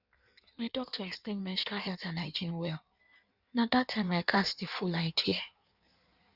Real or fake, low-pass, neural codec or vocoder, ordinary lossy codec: fake; 5.4 kHz; codec, 16 kHz in and 24 kHz out, 1.1 kbps, FireRedTTS-2 codec; Opus, 64 kbps